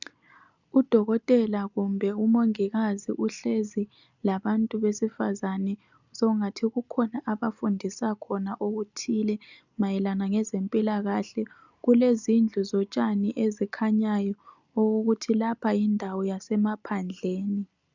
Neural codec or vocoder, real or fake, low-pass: none; real; 7.2 kHz